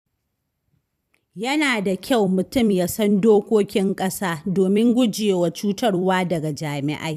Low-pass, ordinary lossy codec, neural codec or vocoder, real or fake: 14.4 kHz; none; vocoder, 44.1 kHz, 128 mel bands every 256 samples, BigVGAN v2; fake